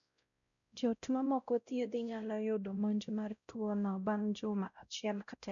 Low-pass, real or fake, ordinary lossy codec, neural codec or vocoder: 7.2 kHz; fake; none; codec, 16 kHz, 0.5 kbps, X-Codec, WavLM features, trained on Multilingual LibriSpeech